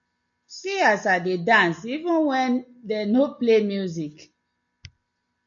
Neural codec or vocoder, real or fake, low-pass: none; real; 7.2 kHz